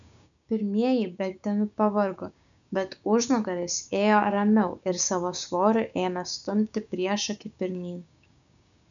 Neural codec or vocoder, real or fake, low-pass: codec, 16 kHz, 6 kbps, DAC; fake; 7.2 kHz